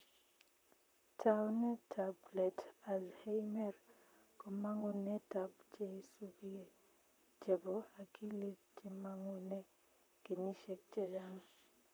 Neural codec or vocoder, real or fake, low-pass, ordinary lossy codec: vocoder, 44.1 kHz, 128 mel bands, Pupu-Vocoder; fake; none; none